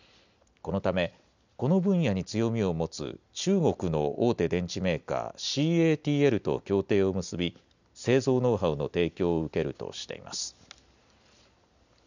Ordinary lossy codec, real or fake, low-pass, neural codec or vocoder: none; real; 7.2 kHz; none